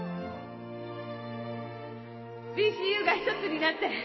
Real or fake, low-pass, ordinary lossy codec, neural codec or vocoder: real; 7.2 kHz; MP3, 24 kbps; none